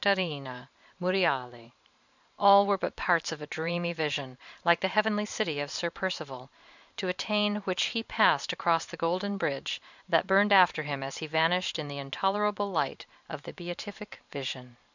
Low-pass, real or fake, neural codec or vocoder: 7.2 kHz; fake; vocoder, 44.1 kHz, 128 mel bands every 512 samples, BigVGAN v2